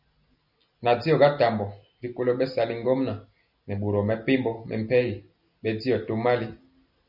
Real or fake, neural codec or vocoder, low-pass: real; none; 5.4 kHz